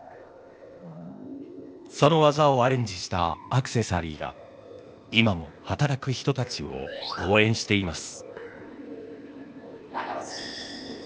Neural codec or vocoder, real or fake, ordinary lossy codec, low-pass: codec, 16 kHz, 0.8 kbps, ZipCodec; fake; none; none